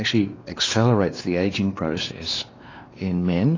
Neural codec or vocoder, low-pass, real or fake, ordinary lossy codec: codec, 16 kHz, 2 kbps, X-Codec, WavLM features, trained on Multilingual LibriSpeech; 7.2 kHz; fake; AAC, 32 kbps